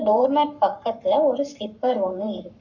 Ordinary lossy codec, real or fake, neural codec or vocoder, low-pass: none; fake; codec, 44.1 kHz, 7.8 kbps, Pupu-Codec; 7.2 kHz